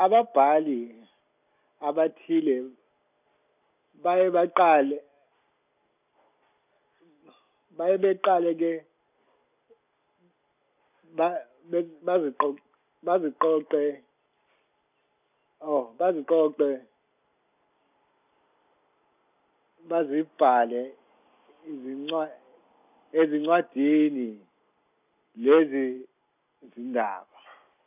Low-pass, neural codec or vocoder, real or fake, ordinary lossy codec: 3.6 kHz; none; real; none